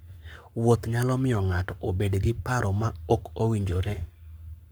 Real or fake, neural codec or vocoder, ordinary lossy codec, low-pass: fake; codec, 44.1 kHz, 7.8 kbps, Pupu-Codec; none; none